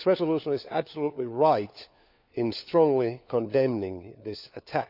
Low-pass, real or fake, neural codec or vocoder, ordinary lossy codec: 5.4 kHz; fake; codec, 16 kHz, 4 kbps, FunCodec, trained on LibriTTS, 50 frames a second; none